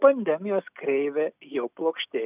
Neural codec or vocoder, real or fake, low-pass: none; real; 3.6 kHz